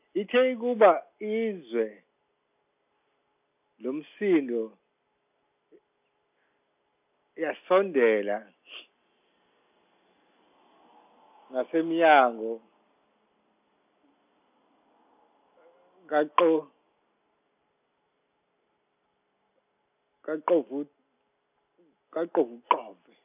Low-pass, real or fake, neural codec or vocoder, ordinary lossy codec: 3.6 kHz; real; none; none